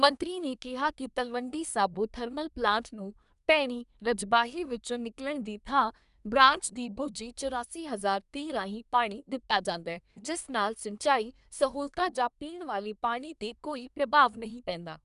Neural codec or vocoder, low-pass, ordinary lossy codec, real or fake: codec, 24 kHz, 1 kbps, SNAC; 10.8 kHz; none; fake